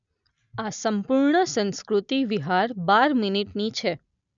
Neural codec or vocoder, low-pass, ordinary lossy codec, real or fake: none; 7.2 kHz; none; real